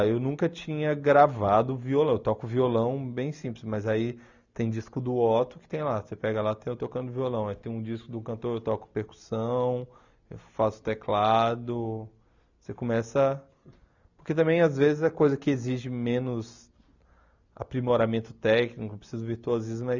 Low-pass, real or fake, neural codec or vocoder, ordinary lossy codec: 7.2 kHz; real; none; none